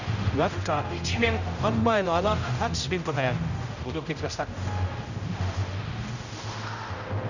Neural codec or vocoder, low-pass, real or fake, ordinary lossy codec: codec, 16 kHz, 0.5 kbps, X-Codec, HuBERT features, trained on general audio; 7.2 kHz; fake; none